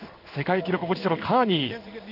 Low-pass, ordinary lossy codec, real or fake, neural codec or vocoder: 5.4 kHz; AAC, 48 kbps; fake; codec, 16 kHz in and 24 kHz out, 1 kbps, XY-Tokenizer